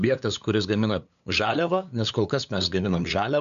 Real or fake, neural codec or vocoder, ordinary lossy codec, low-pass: fake; codec, 16 kHz, 8 kbps, FunCodec, trained on LibriTTS, 25 frames a second; MP3, 96 kbps; 7.2 kHz